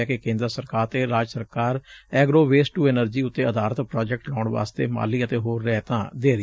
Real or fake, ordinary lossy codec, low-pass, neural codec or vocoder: real; none; none; none